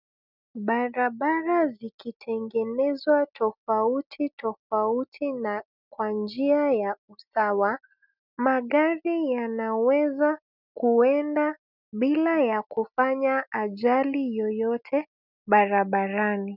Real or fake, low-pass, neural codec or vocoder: real; 5.4 kHz; none